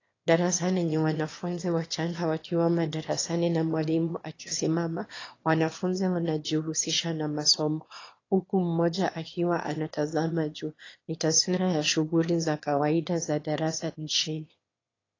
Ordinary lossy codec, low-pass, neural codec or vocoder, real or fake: AAC, 32 kbps; 7.2 kHz; autoencoder, 22.05 kHz, a latent of 192 numbers a frame, VITS, trained on one speaker; fake